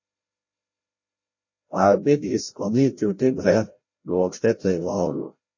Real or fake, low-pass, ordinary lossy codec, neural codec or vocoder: fake; 7.2 kHz; MP3, 32 kbps; codec, 16 kHz, 0.5 kbps, FreqCodec, larger model